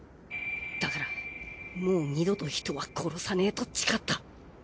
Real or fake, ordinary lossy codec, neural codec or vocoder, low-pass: real; none; none; none